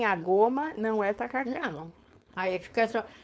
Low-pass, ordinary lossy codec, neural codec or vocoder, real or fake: none; none; codec, 16 kHz, 4.8 kbps, FACodec; fake